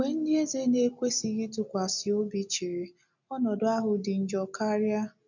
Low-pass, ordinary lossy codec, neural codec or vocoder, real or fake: 7.2 kHz; none; none; real